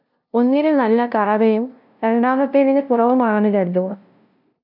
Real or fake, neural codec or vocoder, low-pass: fake; codec, 16 kHz, 0.5 kbps, FunCodec, trained on LibriTTS, 25 frames a second; 5.4 kHz